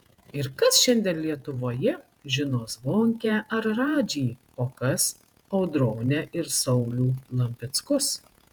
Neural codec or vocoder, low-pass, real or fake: vocoder, 48 kHz, 128 mel bands, Vocos; 19.8 kHz; fake